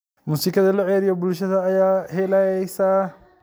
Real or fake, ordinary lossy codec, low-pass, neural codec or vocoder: real; none; none; none